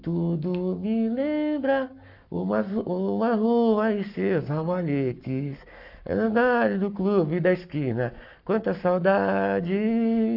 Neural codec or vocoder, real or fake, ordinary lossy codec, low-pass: codec, 44.1 kHz, 7.8 kbps, Pupu-Codec; fake; none; 5.4 kHz